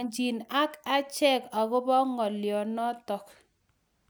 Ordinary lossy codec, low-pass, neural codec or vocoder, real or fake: none; none; none; real